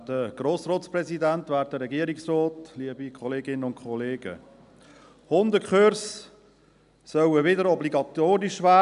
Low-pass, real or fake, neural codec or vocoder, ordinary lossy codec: 10.8 kHz; real; none; none